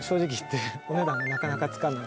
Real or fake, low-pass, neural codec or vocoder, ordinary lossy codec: real; none; none; none